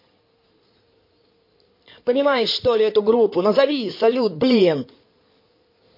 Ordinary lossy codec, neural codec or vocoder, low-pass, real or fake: MP3, 32 kbps; codec, 16 kHz in and 24 kHz out, 2.2 kbps, FireRedTTS-2 codec; 5.4 kHz; fake